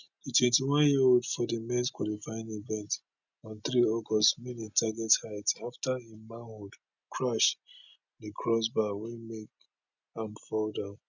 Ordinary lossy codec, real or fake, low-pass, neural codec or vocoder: none; real; 7.2 kHz; none